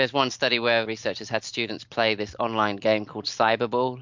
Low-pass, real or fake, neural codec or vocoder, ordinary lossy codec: 7.2 kHz; real; none; MP3, 64 kbps